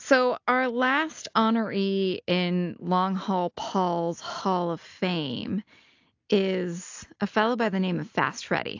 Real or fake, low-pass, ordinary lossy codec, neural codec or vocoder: real; 7.2 kHz; AAC, 48 kbps; none